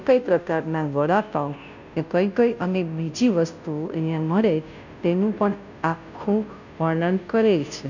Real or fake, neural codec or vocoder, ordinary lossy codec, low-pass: fake; codec, 16 kHz, 0.5 kbps, FunCodec, trained on Chinese and English, 25 frames a second; none; 7.2 kHz